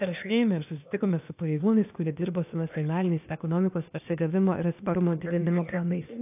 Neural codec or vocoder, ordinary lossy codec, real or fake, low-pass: codec, 16 kHz, 0.8 kbps, ZipCodec; AAC, 32 kbps; fake; 3.6 kHz